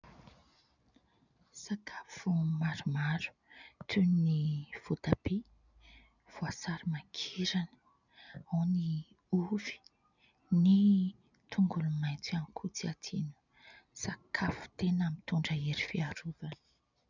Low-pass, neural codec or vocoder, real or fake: 7.2 kHz; none; real